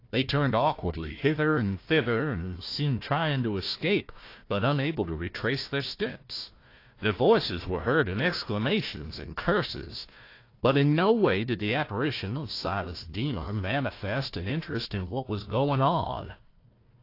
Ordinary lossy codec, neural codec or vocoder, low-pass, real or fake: AAC, 32 kbps; codec, 16 kHz, 1 kbps, FunCodec, trained on Chinese and English, 50 frames a second; 5.4 kHz; fake